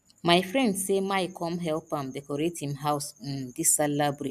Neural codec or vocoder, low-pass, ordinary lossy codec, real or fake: none; 14.4 kHz; none; real